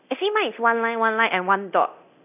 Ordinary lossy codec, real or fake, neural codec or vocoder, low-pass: none; fake; codec, 24 kHz, 0.9 kbps, DualCodec; 3.6 kHz